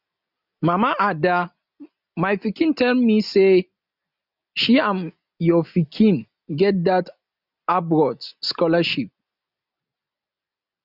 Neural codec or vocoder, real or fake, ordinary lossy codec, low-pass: none; real; none; 5.4 kHz